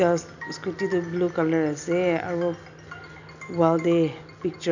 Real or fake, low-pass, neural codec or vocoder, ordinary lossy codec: real; 7.2 kHz; none; none